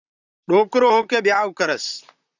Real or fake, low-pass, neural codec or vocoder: fake; 7.2 kHz; vocoder, 44.1 kHz, 128 mel bands, Pupu-Vocoder